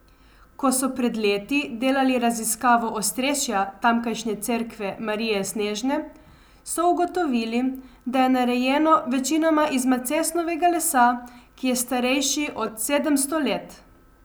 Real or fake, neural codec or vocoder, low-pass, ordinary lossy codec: real; none; none; none